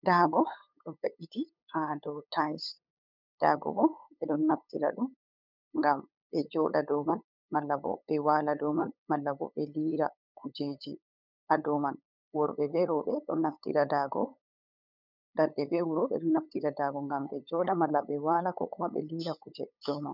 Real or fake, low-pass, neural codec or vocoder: fake; 5.4 kHz; codec, 16 kHz, 8 kbps, FunCodec, trained on LibriTTS, 25 frames a second